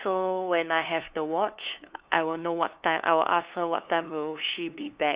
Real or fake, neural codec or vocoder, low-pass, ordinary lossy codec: fake; codec, 16 kHz, 2 kbps, FunCodec, trained on LibriTTS, 25 frames a second; 3.6 kHz; Opus, 64 kbps